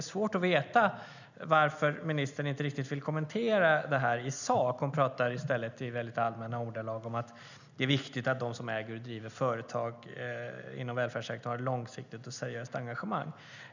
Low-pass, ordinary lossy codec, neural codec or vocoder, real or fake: 7.2 kHz; none; none; real